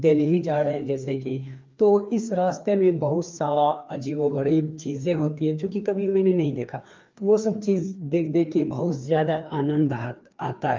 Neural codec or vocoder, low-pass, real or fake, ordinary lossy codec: codec, 16 kHz, 2 kbps, FreqCodec, larger model; 7.2 kHz; fake; Opus, 24 kbps